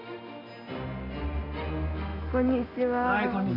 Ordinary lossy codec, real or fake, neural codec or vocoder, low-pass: AAC, 24 kbps; real; none; 5.4 kHz